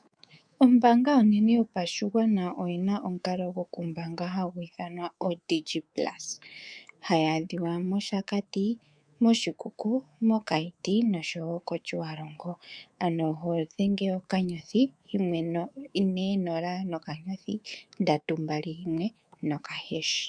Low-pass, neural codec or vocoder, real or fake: 9.9 kHz; codec, 24 kHz, 3.1 kbps, DualCodec; fake